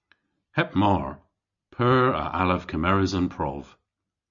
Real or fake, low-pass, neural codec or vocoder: real; 7.2 kHz; none